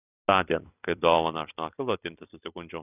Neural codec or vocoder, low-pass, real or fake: vocoder, 44.1 kHz, 128 mel bands every 256 samples, BigVGAN v2; 3.6 kHz; fake